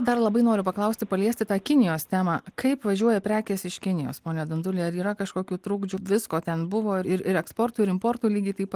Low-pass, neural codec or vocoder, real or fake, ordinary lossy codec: 14.4 kHz; none; real; Opus, 24 kbps